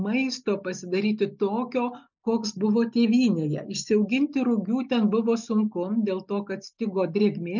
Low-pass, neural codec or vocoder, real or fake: 7.2 kHz; none; real